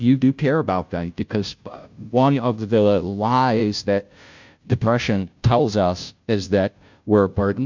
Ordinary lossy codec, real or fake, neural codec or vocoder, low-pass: MP3, 64 kbps; fake; codec, 16 kHz, 0.5 kbps, FunCodec, trained on Chinese and English, 25 frames a second; 7.2 kHz